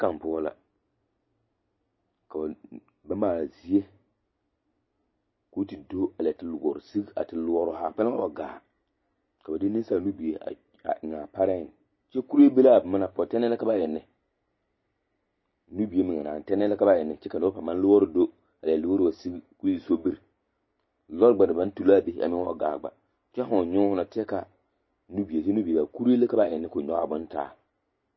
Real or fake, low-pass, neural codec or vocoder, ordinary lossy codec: real; 7.2 kHz; none; MP3, 24 kbps